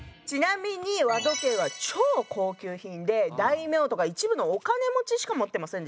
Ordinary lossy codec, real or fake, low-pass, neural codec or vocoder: none; real; none; none